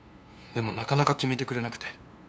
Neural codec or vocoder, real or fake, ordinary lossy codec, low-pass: codec, 16 kHz, 2 kbps, FunCodec, trained on LibriTTS, 25 frames a second; fake; none; none